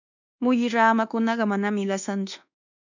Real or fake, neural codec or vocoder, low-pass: fake; codec, 24 kHz, 1.2 kbps, DualCodec; 7.2 kHz